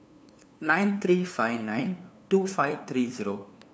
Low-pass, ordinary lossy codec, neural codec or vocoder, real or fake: none; none; codec, 16 kHz, 2 kbps, FunCodec, trained on LibriTTS, 25 frames a second; fake